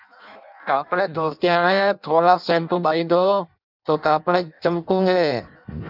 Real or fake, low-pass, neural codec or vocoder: fake; 5.4 kHz; codec, 16 kHz in and 24 kHz out, 0.6 kbps, FireRedTTS-2 codec